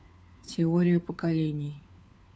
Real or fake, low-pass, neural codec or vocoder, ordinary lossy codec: fake; none; codec, 16 kHz, 4 kbps, FunCodec, trained on LibriTTS, 50 frames a second; none